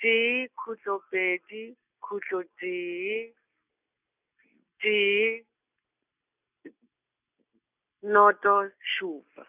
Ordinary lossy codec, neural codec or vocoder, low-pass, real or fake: none; none; 3.6 kHz; real